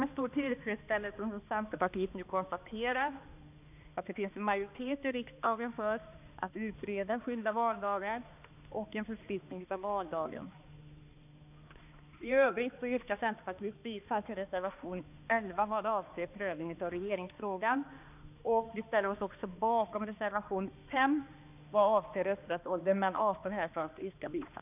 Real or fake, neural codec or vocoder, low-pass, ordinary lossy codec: fake; codec, 16 kHz, 2 kbps, X-Codec, HuBERT features, trained on balanced general audio; 3.6 kHz; none